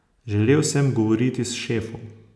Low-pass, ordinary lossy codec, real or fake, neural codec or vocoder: none; none; real; none